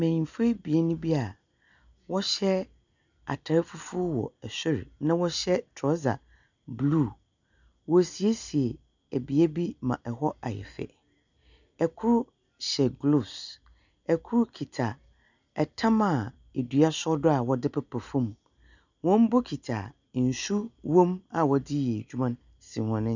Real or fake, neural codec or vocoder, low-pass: real; none; 7.2 kHz